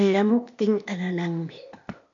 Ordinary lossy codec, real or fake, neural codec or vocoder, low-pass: MP3, 48 kbps; fake; codec, 16 kHz, 0.8 kbps, ZipCodec; 7.2 kHz